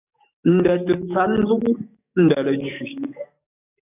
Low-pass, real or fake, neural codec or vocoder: 3.6 kHz; fake; codec, 44.1 kHz, 7.8 kbps, DAC